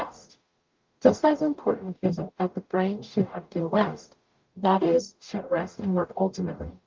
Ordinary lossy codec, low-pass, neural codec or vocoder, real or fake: Opus, 32 kbps; 7.2 kHz; codec, 44.1 kHz, 0.9 kbps, DAC; fake